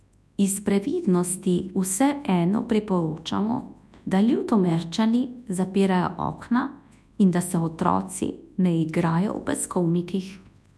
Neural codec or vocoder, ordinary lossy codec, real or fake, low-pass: codec, 24 kHz, 0.9 kbps, WavTokenizer, large speech release; none; fake; none